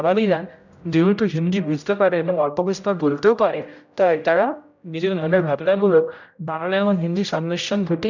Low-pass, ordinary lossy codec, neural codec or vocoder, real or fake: 7.2 kHz; none; codec, 16 kHz, 0.5 kbps, X-Codec, HuBERT features, trained on general audio; fake